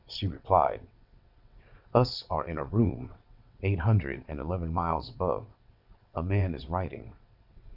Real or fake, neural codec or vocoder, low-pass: fake; vocoder, 22.05 kHz, 80 mel bands, WaveNeXt; 5.4 kHz